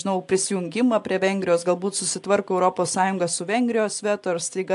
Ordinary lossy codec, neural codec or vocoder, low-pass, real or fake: AAC, 64 kbps; none; 10.8 kHz; real